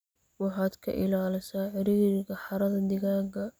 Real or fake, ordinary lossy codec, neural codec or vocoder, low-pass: real; none; none; none